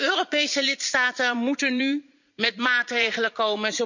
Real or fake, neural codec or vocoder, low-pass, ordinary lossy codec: fake; vocoder, 44.1 kHz, 80 mel bands, Vocos; 7.2 kHz; none